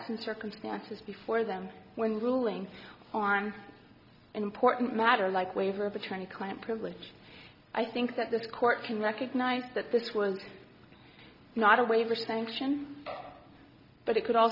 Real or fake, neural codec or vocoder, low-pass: real; none; 5.4 kHz